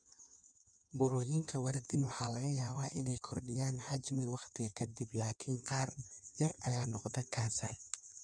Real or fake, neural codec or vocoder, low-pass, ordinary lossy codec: fake; codec, 16 kHz in and 24 kHz out, 1.1 kbps, FireRedTTS-2 codec; 9.9 kHz; none